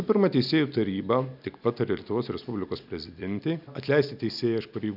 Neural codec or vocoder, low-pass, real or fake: none; 5.4 kHz; real